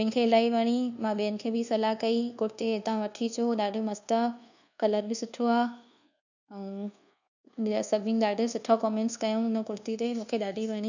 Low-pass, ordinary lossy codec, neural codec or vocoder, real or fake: 7.2 kHz; none; codec, 24 kHz, 1.2 kbps, DualCodec; fake